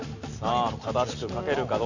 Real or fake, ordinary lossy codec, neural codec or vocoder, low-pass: real; none; none; 7.2 kHz